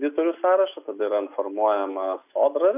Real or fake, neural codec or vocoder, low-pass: real; none; 3.6 kHz